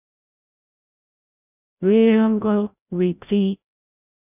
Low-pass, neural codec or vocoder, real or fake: 3.6 kHz; codec, 16 kHz, 0.5 kbps, FreqCodec, larger model; fake